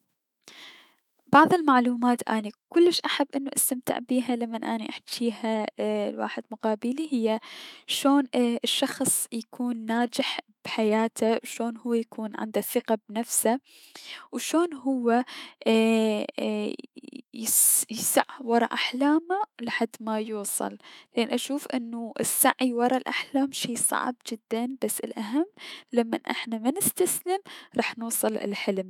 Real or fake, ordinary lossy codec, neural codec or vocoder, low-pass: fake; none; autoencoder, 48 kHz, 128 numbers a frame, DAC-VAE, trained on Japanese speech; 19.8 kHz